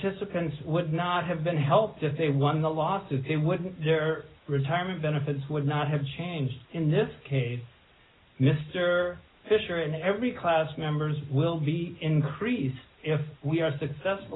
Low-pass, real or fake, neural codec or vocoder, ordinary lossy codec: 7.2 kHz; real; none; AAC, 16 kbps